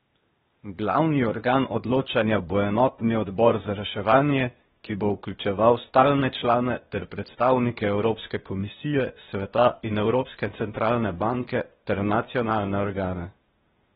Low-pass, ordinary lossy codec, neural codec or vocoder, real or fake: 7.2 kHz; AAC, 16 kbps; codec, 16 kHz, 0.8 kbps, ZipCodec; fake